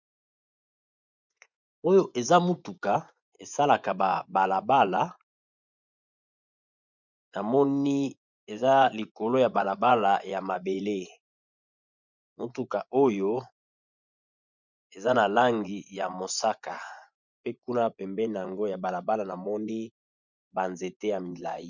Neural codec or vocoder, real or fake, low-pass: vocoder, 24 kHz, 100 mel bands, Vocos; fake; 7.2 kHz